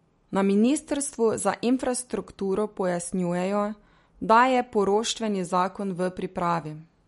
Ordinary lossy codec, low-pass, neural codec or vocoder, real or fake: MP3, 48 kbps; 19.8 kHz; none; real